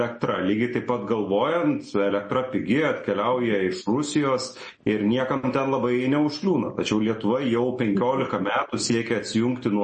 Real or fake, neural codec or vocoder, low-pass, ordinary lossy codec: real; none; 10.8 kHz; MP3, 32 kbps